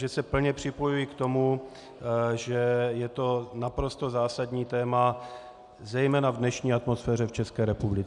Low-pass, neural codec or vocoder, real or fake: 10.8 kHz; none; real